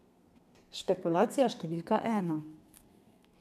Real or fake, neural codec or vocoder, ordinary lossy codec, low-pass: fake; codec, 32 kHz, 1.9 kbps, SNAC; none; 14.4 kHz